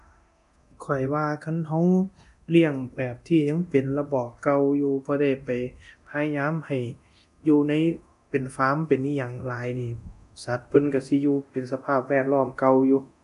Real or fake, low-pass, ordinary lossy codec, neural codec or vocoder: fake; 10.8 kHz; none; codec, 24 kHz, 0.9 kbps, DualCodec